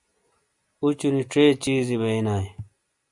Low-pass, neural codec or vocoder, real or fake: 10.8 kHz; none; real